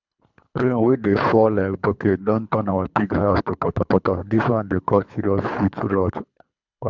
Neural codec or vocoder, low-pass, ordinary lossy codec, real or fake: codec, 24 kHz, 3 kbps, HILCodec; 7.2 kHz; none; fake